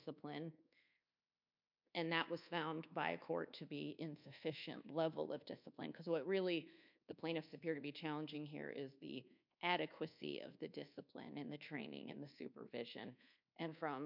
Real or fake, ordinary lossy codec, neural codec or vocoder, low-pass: fake; MP3, 48 kbps; codec, 24 kHz, 1.2 kbps, DualCodec; 5.4 kHz